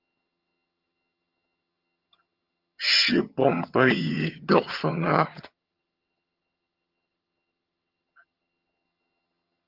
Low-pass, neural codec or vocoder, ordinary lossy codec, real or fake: 5.4 kHz; vocoder, 22.05 kHz, 80 mel bands, HiFi-GAN; Opus, 32 kbps; fake